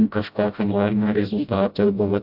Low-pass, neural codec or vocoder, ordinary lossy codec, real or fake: 5.4 kHz; codec, 16 kHz, 0.5 kbps, FreqCodec, smaller model; AAC, 48 kbps; fake